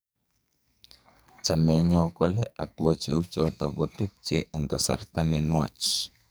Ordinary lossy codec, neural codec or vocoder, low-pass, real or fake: none; codec, 44.1 kHz, 2.6 kbps, SNAC; none; fake